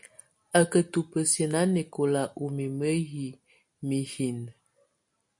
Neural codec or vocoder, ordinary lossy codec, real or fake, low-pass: none; MP3, 48 kbps; real; 10.8 kHz